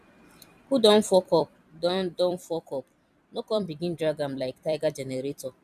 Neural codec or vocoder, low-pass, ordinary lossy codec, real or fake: vocoder, 44.1 kHz, 128 mel bands every 256 samples, BigVGAN v2; 14.4 kHz; none; fake